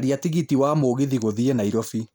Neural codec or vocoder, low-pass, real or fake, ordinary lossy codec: none; none; real; none